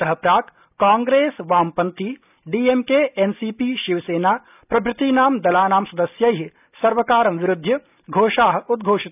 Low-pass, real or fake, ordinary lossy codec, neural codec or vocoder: 3.6 kHz; real; none; none